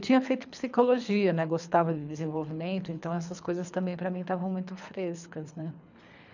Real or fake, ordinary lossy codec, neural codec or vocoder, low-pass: fake; none; codec, 24 kHz, 3 kbps, HILCodec; 7.2 kHz